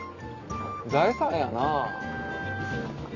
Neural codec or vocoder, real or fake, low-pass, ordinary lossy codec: none; real; 7.2 kHz; Opus, 64 kbps